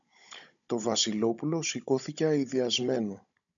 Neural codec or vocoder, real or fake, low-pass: codec, 16 kHz, 16 kbps, FunCodec, trained on Chinese and English, 50 frames a second; fake; 7.2 kHz